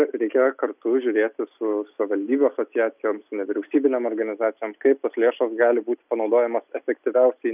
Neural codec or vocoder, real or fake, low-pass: none; real; 3.6 kHz